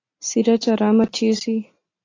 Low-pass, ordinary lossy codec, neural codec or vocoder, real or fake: 7.2 kHz; AAC, 32 kbps; none; real